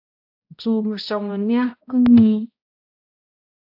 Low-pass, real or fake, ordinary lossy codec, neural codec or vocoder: 5.4 kHz; fake; AAC, 48 kbps; codec, 16 kHz, 0.5 kbps, X-Codec, HuBERT features, trained on balanced general audio